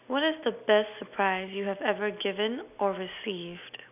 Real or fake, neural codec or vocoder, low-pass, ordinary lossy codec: real; none; 3.6 kHz; none